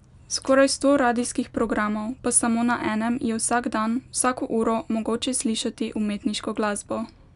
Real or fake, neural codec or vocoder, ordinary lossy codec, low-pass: real; none; none; 10.8 kHz